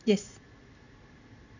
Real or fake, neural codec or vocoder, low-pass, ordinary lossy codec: real; none; 7.2 kHz; none